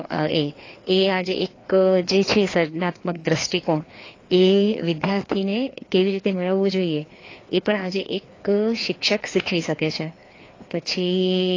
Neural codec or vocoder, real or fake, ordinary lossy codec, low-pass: codec, 16 kHz, 2 kbps, FreqCodec, larger model; fake; AAC, 32 kbps; 7.2 kHz